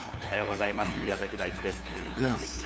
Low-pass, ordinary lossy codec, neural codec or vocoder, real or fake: none; none; codec, 16 kHz, 2 kbps, FunCodec, trained on LibriTTS, 25 frames a second; fake